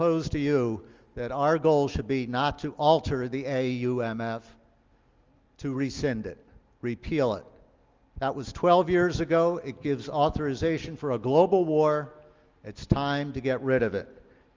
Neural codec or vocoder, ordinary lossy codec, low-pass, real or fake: none; Opus, 32 kbps; 7.2 kHz; real